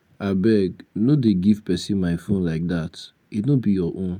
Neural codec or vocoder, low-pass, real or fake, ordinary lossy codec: none; 19.8 kHz; real; none